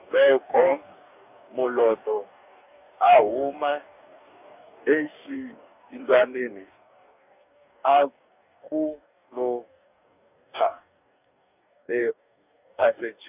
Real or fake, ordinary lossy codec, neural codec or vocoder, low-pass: fake; none; codec, 44.1 kHz, 2.6 kbps, DAC; 3.6 kHz